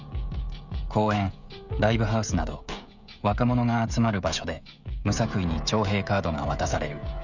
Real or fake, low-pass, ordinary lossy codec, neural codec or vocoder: fake; 7.2 kHz; none; codec, 16 kHz, 16 kbps, FreqCodec, smaller model